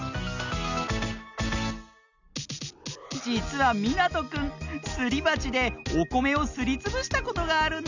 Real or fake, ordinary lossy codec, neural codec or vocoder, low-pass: real; none; none; 7.2 kHz